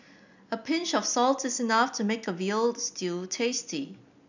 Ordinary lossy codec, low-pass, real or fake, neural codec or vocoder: none; 7.2 kHz; real; none